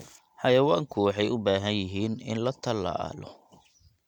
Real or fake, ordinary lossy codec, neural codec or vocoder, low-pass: real; none; none; 19.8 kHz